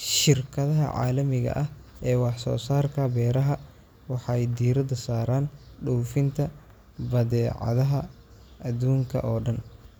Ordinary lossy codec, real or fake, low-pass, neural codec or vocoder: none; real; none; none